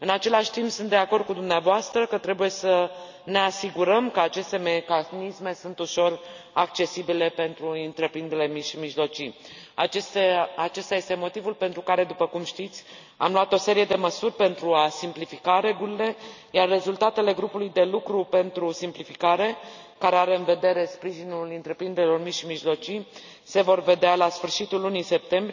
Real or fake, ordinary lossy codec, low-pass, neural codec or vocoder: real; none; 7.2 kHz; none